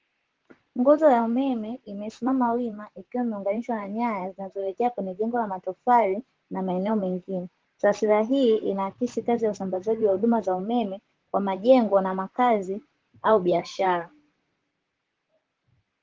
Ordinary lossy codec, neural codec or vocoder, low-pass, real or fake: Opus, 16 kbps; vocoder, 44.1 kHz, 128 mel bands, Pupu-Vocoder; 7.2 kHz; fake